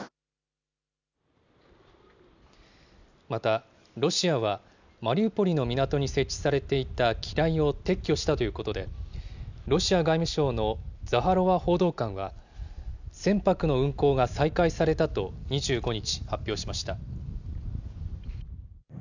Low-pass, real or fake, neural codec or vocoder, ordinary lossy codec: 7.2 kHz; real; none; none